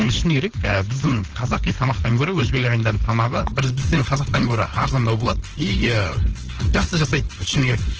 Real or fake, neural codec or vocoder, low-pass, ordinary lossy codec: fake; codec, 16 kHz, 4.8 kbps, FACodec; 7.2 kHz; Opus, 16 kbps